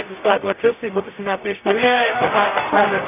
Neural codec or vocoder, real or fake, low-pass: codec, 44.1 kHz, 0.9 kbps, DAC; fake; 3.6 kHz